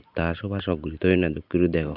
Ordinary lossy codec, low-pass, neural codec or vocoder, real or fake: none; 5.4 kHz; none; real